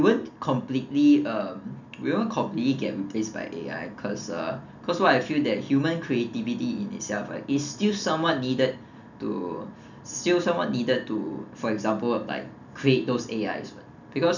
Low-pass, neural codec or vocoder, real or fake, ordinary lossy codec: 7.2 kHz; none; real; none